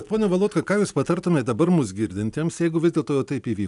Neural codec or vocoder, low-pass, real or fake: none; 10.8 kHz; real